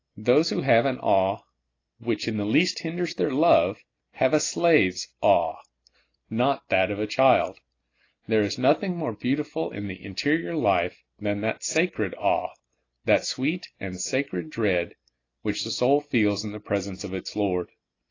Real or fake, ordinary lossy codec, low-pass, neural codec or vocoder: real; AAC, 32 kbps; 7.2 kHz; none